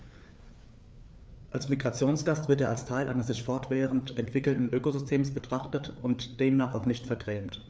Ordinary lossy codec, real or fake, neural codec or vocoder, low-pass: none; fake; codec, 16 kHz, 4 kbps, FreqCodec, larger model; none